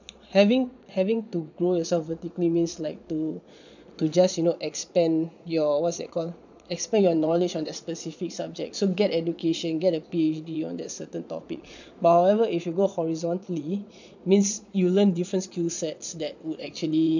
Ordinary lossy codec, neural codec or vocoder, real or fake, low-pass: none; vocoder, 22.05 kHz, 80 mel bands, Vocos; fake; 7.2 kHz